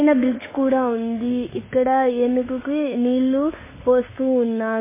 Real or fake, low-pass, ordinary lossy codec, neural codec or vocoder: fake; 3.6 kHz; MP3, 16 kbps; autoencoder, 48 kHz, 32 numbers a frame, DAC-VAE, trained on Japanese speech